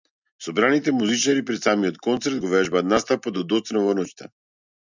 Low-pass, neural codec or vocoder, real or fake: 7.2 kHz; none; real